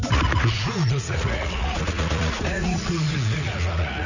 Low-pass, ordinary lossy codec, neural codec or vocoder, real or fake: 7.2 kHz; none; vocoder, 44.1 kHz, 80 mel bands, Vocos; fake